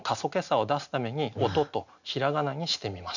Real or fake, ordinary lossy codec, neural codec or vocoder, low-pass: real; none; none; 7.2 kHz